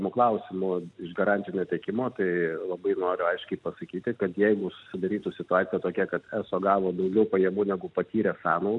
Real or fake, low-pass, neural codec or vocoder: real; 10.8 kHz; none